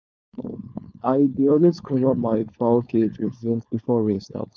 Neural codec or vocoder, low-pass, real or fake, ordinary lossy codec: codec, 16 kHz, 4.8 kbps, FACodec; none; fake; none